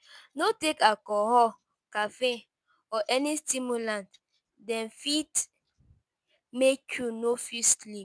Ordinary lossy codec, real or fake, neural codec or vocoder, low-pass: none; real; none; none